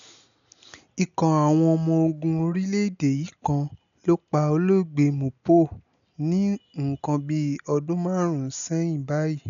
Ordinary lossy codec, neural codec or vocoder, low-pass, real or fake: none; none; 7.2 kHz; real